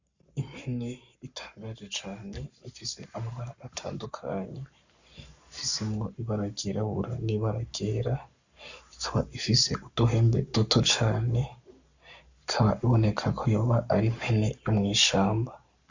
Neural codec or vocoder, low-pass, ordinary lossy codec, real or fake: codec, 44.1 kHz, 7.8 kbps, Pupu-Codec; 7.2 kHz; Opus, 64 kbps; fake